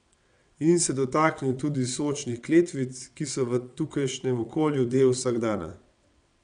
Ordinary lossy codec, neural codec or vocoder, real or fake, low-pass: none; vocoder, 22.05 kHz, 80 mel bands, WaveNeXt; fake; 9.9 kHz